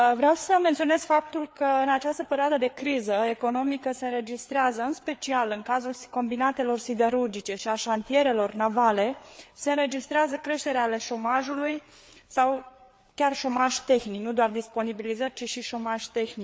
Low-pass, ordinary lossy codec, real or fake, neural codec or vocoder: none; none; fake; codec, 16 kHz, 4 kbps, FreqCodec, larger model